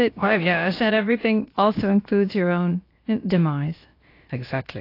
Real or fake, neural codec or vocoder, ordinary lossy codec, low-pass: fake; codec, 16 kHz, about 1 kbps, DyCAST, with the encoder's durations; AAC, 32 kbps; 5.4 kHz